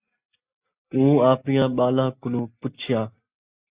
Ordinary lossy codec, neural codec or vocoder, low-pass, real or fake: Opus, 64 kbps; none; 3.6 kHz; real